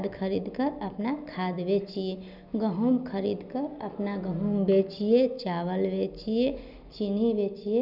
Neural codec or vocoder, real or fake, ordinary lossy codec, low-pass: none; real; none; 5.4 kHz